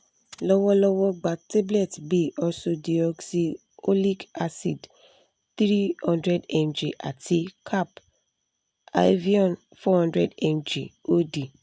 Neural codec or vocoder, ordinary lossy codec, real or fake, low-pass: none; none; real; none